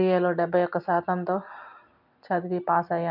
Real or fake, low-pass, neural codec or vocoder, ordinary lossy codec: real; 5.4 kHz; none; none